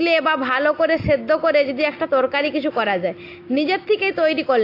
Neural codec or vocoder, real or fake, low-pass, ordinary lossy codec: none; real; 5.4 kHz; AAC, 32 kbps